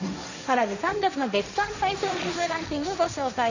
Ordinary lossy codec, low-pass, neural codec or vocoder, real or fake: none; 7.2 kHz; codec, 16 kHz, 1.1 kbps, Voila-Tokenizer; fake